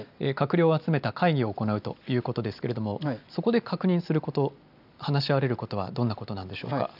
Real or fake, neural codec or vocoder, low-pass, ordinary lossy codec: real; none; 5.4 kHz; none